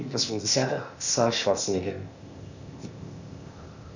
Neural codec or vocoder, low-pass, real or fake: codec, 16 kHz, 0.8 kbps, ZipCodec; 7.2 kHz; fake